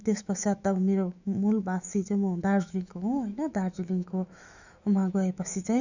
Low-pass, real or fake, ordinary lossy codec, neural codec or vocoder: 7.2 kHz; fake; none; autoencoder, 48 kHz, 128 numbers a frame, DAC-VAE, trained on Japanese speech